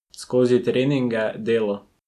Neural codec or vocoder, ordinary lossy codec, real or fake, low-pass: none; none; real; 14.4 kHz